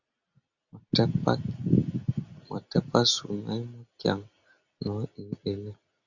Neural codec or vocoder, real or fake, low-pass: none; real; 7.2 kHz